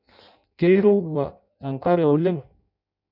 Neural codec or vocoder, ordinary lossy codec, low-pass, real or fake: codec, 16 kHz in and 24 kHz out, 0.6 kbps, FireRedTTS-2 codec; none; 5.4 kHz; fake